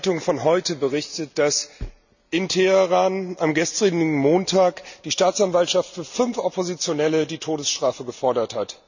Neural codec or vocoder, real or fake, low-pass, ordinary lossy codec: none; real; 7.2 kHz; none